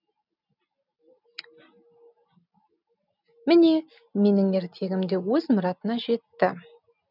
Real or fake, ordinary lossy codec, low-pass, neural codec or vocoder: real; none; 5.4 kHz; none